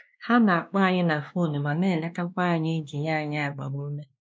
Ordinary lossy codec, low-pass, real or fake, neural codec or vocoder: none; none; fake; codec, 16 kHz, 2 kbps, X-Codec, WavLM features, trained on Multilingual LibriSpeech